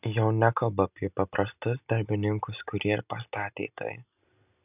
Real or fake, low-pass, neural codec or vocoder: real; 3.6 kHz; none